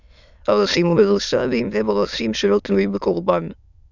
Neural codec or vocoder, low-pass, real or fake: autoencoder, 22.05 kHz, a latent of 192 numbers a frame, VITS, trained on many speakers; 7.2 kHz; fake